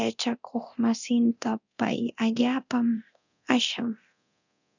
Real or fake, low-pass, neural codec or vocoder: fake; 7.2 kHz; codec, 24 kHz, 0.9 kbps, DualCodec